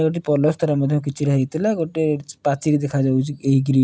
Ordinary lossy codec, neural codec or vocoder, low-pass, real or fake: none; none; none; real